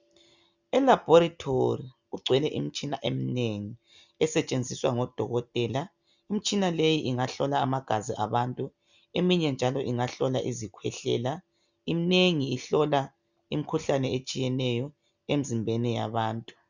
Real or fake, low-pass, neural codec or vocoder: real; 7.2 kHz; none